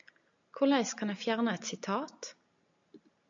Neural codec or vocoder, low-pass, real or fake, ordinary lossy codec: none; 7.2 kHz; real; AAC, 64 kbps